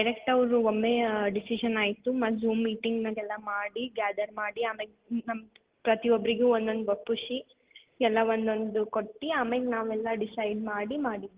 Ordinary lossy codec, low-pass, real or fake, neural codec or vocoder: Opus, 16 kbps; 3.6 kHz; real; none